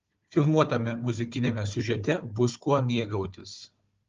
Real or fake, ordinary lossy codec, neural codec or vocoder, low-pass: fake; Opus, 32 kbps; codec, 16 kHz, 4 kbps, FunCodec, trained on Chinese and English, 50 frames a second; 7.2 kHz